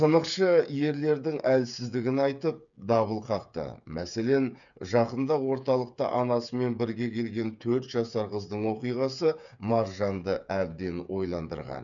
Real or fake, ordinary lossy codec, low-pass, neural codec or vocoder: fake; none; 7.2 kHz; codec, 16 kHz, 8 kbps, FreqCodec, smaller model